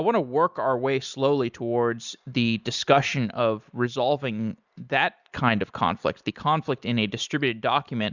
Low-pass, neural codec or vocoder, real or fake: 7.2 kHz; none; real